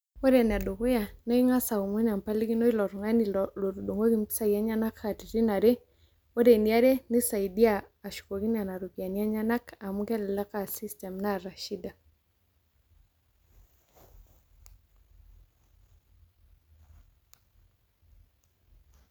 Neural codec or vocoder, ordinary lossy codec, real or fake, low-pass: none; none; real; none